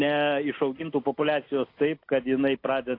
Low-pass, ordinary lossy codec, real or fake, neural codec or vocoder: 5.4 kHz; AAC, 32 kbps; real; none